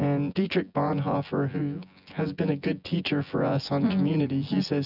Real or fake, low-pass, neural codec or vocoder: fake; 5.4 kHz; vocoder, 24 kHz, 100 mel bands, Vocos